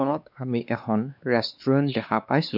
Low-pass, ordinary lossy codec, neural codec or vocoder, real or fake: 5.4 kHz; none; codec, 16 kHz, 2 kbps, X-Codec, WavLM features, trained on Multilingual LibriSpeech; fake